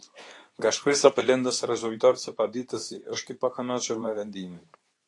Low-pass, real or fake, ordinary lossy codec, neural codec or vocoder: 10.8 kHz; fake; AAC, 48 kbps; codec, 24 kHz, 0.9 kbps, WavTokenizer, medium speech release version 2